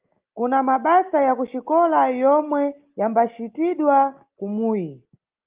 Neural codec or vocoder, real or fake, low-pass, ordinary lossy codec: none; real; 3.6 kHz; Opus, 32 kbps